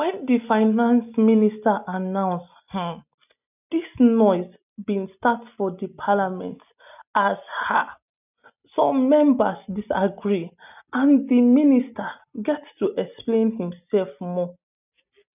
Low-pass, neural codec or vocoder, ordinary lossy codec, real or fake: 3.6 kHz; none; none; real